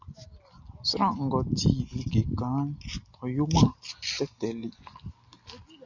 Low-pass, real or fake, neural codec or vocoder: 7.2 kHz; real; none